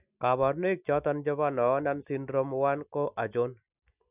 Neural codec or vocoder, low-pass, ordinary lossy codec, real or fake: none; 3.6 kHz; none; real